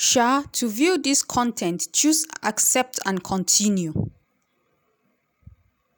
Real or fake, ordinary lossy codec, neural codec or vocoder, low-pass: real; none; none; none